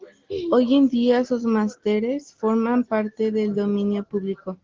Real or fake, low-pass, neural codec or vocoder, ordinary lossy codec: real; 7.2 kHz; none; Opus, 16 kbps